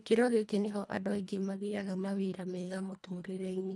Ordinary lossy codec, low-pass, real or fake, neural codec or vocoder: none; none; fake; codec, 24 kHz, 1.5 kbps, HILCodec